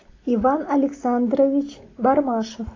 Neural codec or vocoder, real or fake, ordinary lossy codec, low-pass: none; real; AAC, 32 kbps; 7.2 kHz